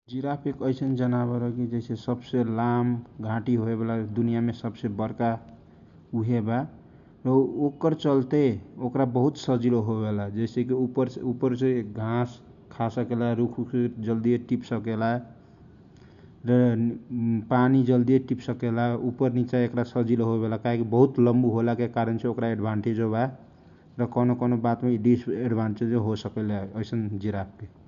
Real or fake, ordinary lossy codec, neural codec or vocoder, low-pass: real; none; none; 7.2 kHz